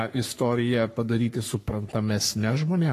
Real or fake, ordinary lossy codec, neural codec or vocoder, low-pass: fake; AAC, 48 kbps; codec, 44.1 kHz, 3.4 kbps, Pupu-Codec; 14.4 kHz